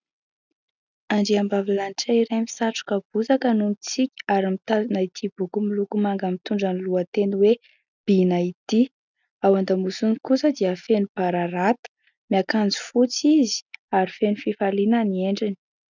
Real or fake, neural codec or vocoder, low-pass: real; none; 7.2 kHz